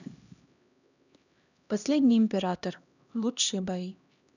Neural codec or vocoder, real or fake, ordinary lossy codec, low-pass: codec, 16 kHz, 1 kbps, X-Codec, HuBERT features, trained on LibriSpeech; fake; none; 7.2 kHz